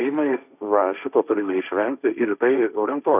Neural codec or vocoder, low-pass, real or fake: codec, 16 kHz, 1.1 kbps, Voila-Tokenizer; 3.6 kHz; fake